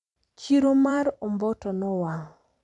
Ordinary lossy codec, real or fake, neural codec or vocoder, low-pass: MP3, 96 kbps; fake; vocoder, 44.1 kHz, 128 mel bands every 256 samples, BigVGAN v2; 10.8 kHz